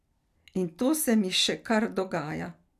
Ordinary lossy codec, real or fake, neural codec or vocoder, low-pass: none; real; none; 14.4 kHz